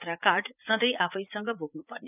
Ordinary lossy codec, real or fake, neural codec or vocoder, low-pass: none; fake; vocoder, 44.1 kHz, 80 mel bands, Vocos; 3.6 kHz